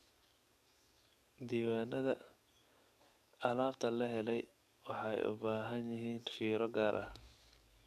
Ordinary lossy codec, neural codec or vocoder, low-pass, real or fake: none; codec, 44.1 kHz, 7.8 kbps, DAC; 14.4 kHz; fake